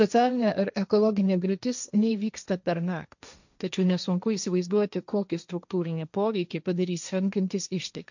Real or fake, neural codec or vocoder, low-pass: fake; codec, 16 kHz, 1.1 kbps, Voila-Tokenizer; 7.2 kHz